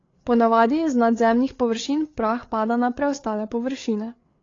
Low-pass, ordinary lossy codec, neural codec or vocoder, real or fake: 7.2 kHz; AAC, 32 kbps; codec, 16 kHz, 8 kbps, FreqCodec, larger model; fake